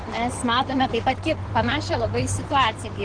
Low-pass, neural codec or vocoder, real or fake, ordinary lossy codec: 9.9 kHz; codec, 16 kHz in and 24 kHz out, 2.2 kbps, FireRedTTS-2 codec; fake; Opus, 16 kbps